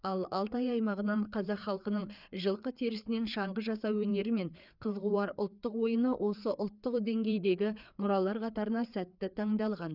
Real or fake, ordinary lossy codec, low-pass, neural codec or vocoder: fake; none; 5.4 kHz; codec, 16 kHz, 4 kbps, FreqCodec, larger model